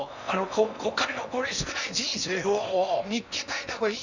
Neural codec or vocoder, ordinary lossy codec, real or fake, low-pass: codec, 16 kHz in and 24 kHz out, 0.6 kbps, FocalCodec, streaming, 4096 codes; none; fake; 7.2 kHz